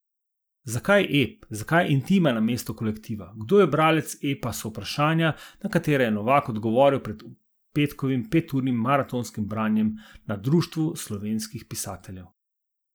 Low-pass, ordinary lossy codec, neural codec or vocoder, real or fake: none; none; none; real